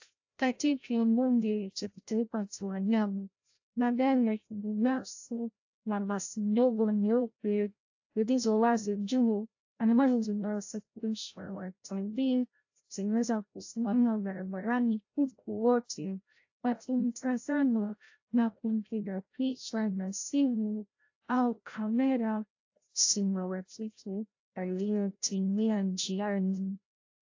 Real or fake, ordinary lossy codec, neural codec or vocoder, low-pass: fake; AAC, 48 kbps; codec, 16 kHz, 0.5 kbps, FreqCodec, larger model; 7.2 kHz